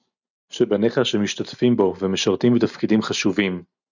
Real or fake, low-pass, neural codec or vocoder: real; 7.2 kHz; none